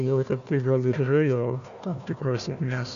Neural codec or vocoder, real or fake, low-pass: codec, 16 kHz, 1 kbps, FunCodec, trained on Chinese and English, 50 frames a second; fake; 7.2 kHz